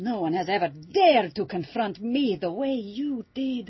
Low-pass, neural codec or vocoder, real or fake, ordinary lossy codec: 7.2 kHz; none; real; MP3, 24 kbps